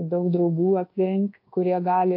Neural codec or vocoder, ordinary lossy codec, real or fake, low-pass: codec, 24 kHz, 1.2 kbps, DualCodec; AAC, 32 kbps; fake; 5.4 kHz